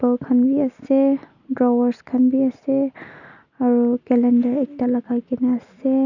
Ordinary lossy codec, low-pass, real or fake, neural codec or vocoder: none; 7.2 kHz; real; none